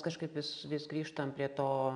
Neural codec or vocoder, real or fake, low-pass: none; real; 9.9 kHz